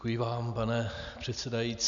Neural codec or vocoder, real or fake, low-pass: none; real; 7.2 kHz